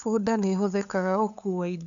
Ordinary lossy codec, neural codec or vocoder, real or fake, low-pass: none; codec, 16 kHz, 8 kbps, FunCodec, trained on LibriTTS, 25 frames a second; fake; 7.2 kHz